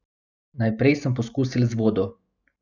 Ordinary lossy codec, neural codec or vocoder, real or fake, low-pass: none; none; real; 7.2 kHz